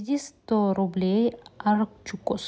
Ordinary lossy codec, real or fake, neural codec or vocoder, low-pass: none; real; none; none